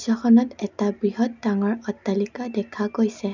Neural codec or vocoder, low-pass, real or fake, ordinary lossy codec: none; 7.2 kHz; real; none